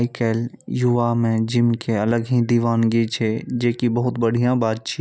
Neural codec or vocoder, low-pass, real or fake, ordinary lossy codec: none; none; real; none